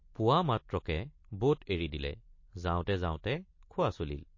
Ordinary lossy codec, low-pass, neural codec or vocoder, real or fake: MP3, 32 kbps; 7.2 kHz; none; real